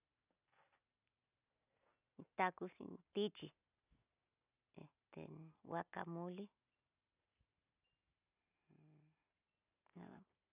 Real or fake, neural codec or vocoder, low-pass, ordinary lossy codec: real; none; 3.6 kHz; none